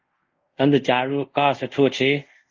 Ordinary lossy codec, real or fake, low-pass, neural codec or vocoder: Opus, 32 kbps; fake; 7.2 kHz; codec, 24 kHz, 0.5 kbps, DualCodec